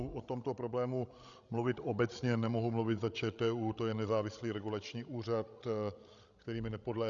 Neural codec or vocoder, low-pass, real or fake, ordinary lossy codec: codec, 16 kHz, 16 kbps, FreqCodec, larger model; 7.2 kHz; fake; Opus, 64 kbps